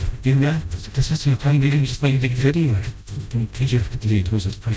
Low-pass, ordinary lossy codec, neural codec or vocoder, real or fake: none; none; codec, 16 kHz, 0.5 kbps, FreqCodec, smaller model; fake